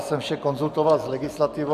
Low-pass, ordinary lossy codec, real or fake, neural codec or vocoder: 14.4 kHz; AAC, 96 kbps; real; none